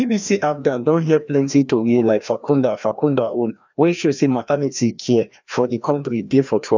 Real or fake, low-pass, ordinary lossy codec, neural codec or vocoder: fake; 7.2 kHz; none; codec, 16 kHz, 1 kbps, FreqCodec, larger model